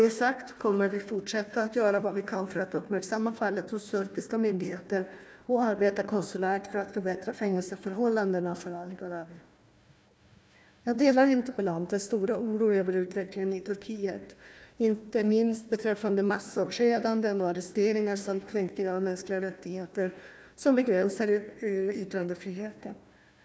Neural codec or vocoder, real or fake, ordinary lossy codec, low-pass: codec, 16 kHz, 1 kbps, FunCodec, trained on Chinese and English, 50 frames a second; fake; none; none